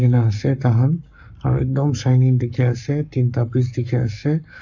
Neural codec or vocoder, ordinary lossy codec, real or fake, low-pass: codec, 44.1 kHz, 7.8 kbps, Pupu-Codec; none; fake; 7.2 kHz